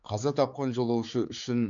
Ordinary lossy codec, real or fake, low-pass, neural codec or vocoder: none; fake; 7.2 kHz; codec, 16 kHz, 4 kbps, X-Codec, HuBERT features, trained on general audio